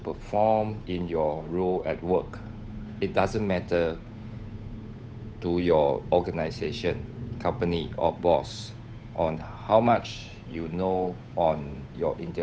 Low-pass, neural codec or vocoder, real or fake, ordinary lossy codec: none; codec, 16 kHz, 8 kbps, FunCodec, trained on Chinese and English, 25 frames a second; fake; none